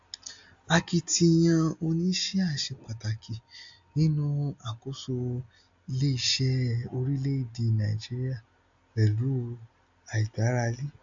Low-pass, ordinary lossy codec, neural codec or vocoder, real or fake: 7.2 kHz; AAC, 64 kbps; none; real